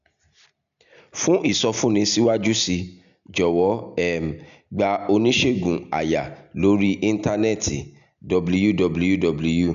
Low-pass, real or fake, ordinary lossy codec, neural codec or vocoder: 7.2 kHz; real; none; none